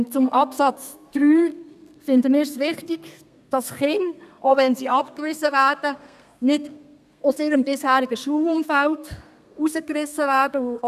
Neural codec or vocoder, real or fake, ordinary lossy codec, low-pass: codec, 32 kHz, 1.9 kbps, SNAC; fake; none; 14.4 kHz